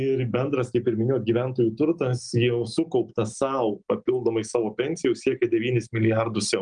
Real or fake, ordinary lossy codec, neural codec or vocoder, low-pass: real; Opus, 32 kbps; none; 9.9 kHz